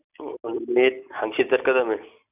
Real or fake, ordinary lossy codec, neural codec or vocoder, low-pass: real; none; none; 3.6 kHz